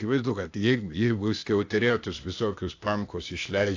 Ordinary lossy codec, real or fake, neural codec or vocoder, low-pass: AAC, 48 kbps; fake; codec, 16 kHz, 0.8 kbps, ZipCodec; 7.2 kHz